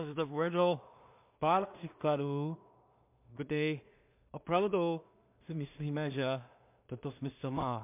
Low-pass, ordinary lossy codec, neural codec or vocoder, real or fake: 3.6 kHz; MP3, 32 kbps; codec, 16 kHz in and 24 kHz out, 0.4 kbps, LongCat-Audio-Codec, two codebook decoder; fake